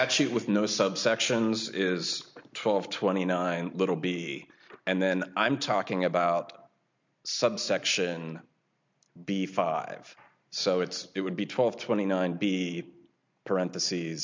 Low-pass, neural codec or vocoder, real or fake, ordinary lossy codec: 7.2 kHz; none; real; MP3, 64 kbps